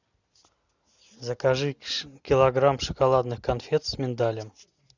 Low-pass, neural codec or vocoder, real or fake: 7.2 kHz; none; real